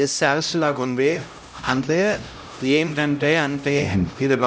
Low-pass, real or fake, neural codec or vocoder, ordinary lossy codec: none; fake; codec, 16 kHz, 0.5 kbps, X-Codec, HuBERT features, trained on LibriSpeech; none